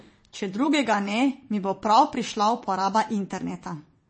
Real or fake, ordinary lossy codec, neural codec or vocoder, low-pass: fake; MP3, 32 kbps; vocoder, 22.05 kHz, 80 mel bands, WaveNeXt; 9.9 kHz